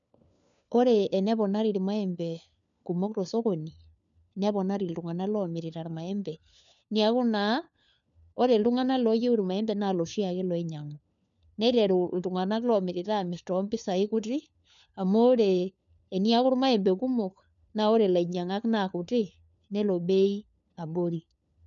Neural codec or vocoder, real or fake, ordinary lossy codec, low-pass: codec, 16 kHz, 4 kbps, FunCodec, trained on LibriTTS, 50 frames a second; fake; none; 7.2 kHz